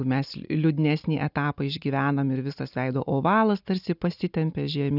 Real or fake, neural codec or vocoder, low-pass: real; none; 5.4 kHz